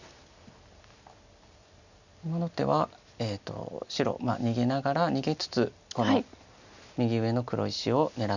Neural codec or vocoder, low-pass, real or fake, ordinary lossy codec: none; 7.2 kHz; real; none